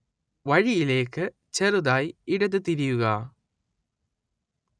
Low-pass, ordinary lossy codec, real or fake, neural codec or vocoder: 9.9 kHz; none; real; none